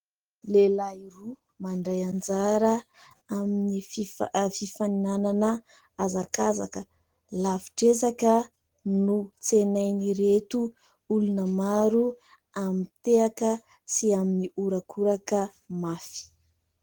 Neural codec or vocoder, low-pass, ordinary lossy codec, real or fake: none; 19.8 kHz; Opus, 16 kbps; real